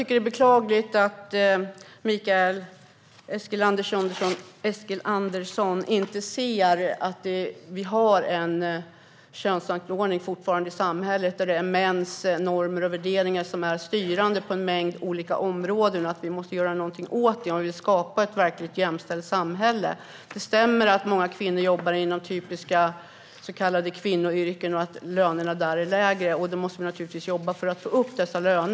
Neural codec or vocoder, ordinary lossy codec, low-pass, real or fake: none; none; none; real